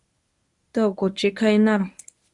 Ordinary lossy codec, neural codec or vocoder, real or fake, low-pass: Opus, 64 kbps; codec, 24 kHz, 0.9 kbps, WavTokenizer, medium speech release version 1; fake; 10.8 kHz